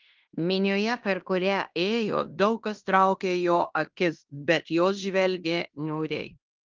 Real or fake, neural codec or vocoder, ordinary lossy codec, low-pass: fake; codec, 16 kHz in and 24 kHz out, 0.9 kbps, LongCat-Audio-Codec, fine tuned four codebook decoder; Opus, 32 kbps; 7.2 kHz